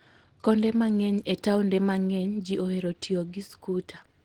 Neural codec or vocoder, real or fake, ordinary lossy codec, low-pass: none; real; Opus, 16 kbps; 19.8 kHz